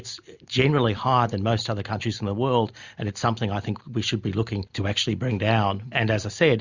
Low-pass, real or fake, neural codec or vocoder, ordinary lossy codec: 7.2 kHz; real; none; Opus, 64 kbps